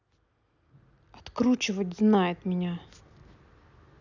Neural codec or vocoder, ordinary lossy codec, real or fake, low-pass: none; none; real; 7.2 kHz